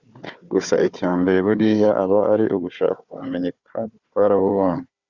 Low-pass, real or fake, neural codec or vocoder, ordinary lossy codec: 7.2 kHz; fake; codec, 16 kHz, 4 kbps, FunCodec, trained on Chinese and English, 50 frames a second; Opus, 64 kbps